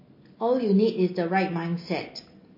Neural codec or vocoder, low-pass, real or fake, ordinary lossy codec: none; 5.4 kHz; real; MP3, 24 kbps